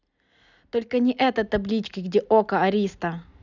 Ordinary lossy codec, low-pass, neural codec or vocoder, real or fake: none; 7.2 kHz; none; real